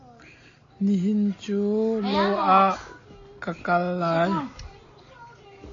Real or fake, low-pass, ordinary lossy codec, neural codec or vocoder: real; 7.2 kHz; AAC, 32 kbps; none